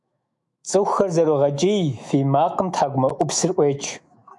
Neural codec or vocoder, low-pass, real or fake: autoencoder, 48 kHz, 128 numbers a frame, DAC-VAE, trained on Japanese speech; 10.8 kHz; fake